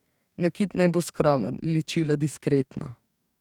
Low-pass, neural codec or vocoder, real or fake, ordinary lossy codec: 19.8 kHz; codec, 44.1 kHz, 2.6 kbps, DAC; fake; none